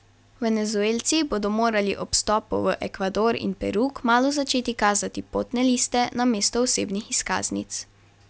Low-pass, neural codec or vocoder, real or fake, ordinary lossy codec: none; none; real; none